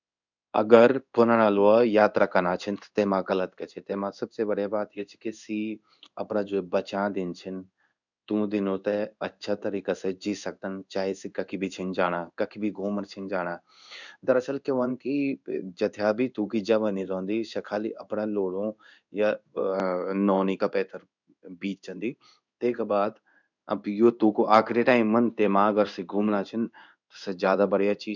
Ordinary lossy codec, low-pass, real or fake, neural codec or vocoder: none; 7.2 kHz; fake; codec, 16 kHz in and 24 kHz out, 1 kbps, XY-Tokenizer